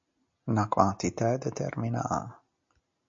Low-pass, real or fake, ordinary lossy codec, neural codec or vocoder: 7.2 kHz; real; MP3, 48 kbps; none